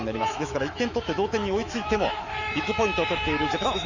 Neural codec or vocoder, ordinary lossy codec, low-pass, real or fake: autoencoder, 48 kHz, 128 numbers a frame, DAC-VAE, trained on Japanese speech; Opus, 64 kbps; 7.2 kHz; fake